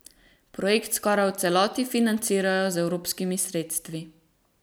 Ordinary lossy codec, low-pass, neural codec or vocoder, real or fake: none; none; none; real